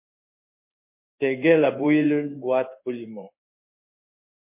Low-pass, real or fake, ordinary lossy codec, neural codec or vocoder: 3.6 kHz; fake; MP3, 24 kbps; codec, 16 kHz in and 24 kHz out, 1 kbps, XY-Tokenizer